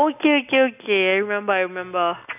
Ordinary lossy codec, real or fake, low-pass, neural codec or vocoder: none; real; 3.6 kHz; none